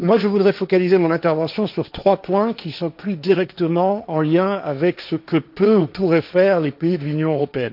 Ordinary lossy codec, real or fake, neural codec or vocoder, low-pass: none; fake; codec, 16 kHz, 1.1 kbps, Voila-Tokenizer; 5.4 kHz